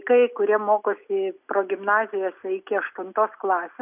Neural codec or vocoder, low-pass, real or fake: none; 3.6 kHz; real